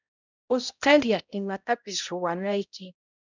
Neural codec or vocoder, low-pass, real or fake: codec, 16 kHz, 0.5 kbps, X-Codec, HuBERT features, trained on balanced general audio; 7.2 kHz; fake